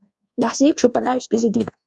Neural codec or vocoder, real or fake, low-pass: codec, 24 kHz, 1.2 kbps, DualCodec; fake; 10.8 kHz